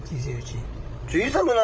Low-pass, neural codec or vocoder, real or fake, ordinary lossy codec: none; codec, 16 kHz, 16 kbps, FreqCodec, larger model; fake; none